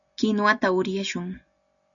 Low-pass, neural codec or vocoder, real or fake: 7.2 kHz; none; real